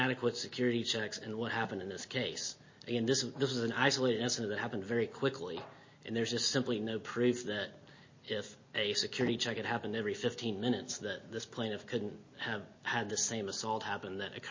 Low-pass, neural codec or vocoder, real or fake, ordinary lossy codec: 7.2 kHz; none; real; MP3, 32 kbps